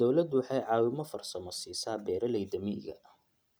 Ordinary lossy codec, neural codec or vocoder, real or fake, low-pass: none; none; real; none